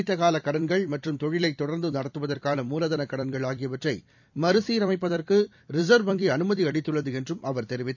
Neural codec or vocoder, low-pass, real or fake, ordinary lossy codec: vocoder, 44.1 kHz, 128 mel bands every 256 samples, BigVGAN v2; 7.2 kHz; fake; none